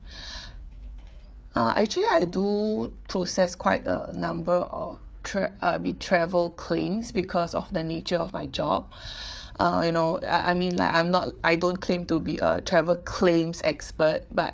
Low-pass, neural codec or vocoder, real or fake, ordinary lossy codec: none; codec, 16 kHz, 4 kbps, FreqCodec, larger model; fake; none